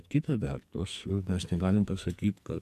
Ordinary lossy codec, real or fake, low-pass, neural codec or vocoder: AAC, 96 kbps; fake; 14.4 kHz; codec, 44.1 kHz, 2.6 kbps, SNAC